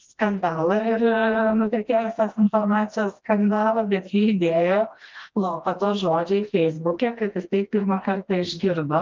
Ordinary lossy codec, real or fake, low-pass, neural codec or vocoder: Opus, 32 kbps; fake; 7.2 kHz; codec, 16 kHz, 1 kbps, FreqCodec, smaller model